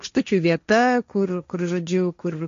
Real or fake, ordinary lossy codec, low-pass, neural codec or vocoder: fake; MP3, 48 kbps; 7.2 kHz; codec, 16 kHz, 1.1 kbps, Voila-Tokenizer